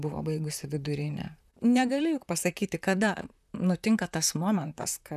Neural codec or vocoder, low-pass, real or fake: vocoder, 44.1 kHz, 128 mel bands, Pupu-Vocoder; 14.4 kHz; fake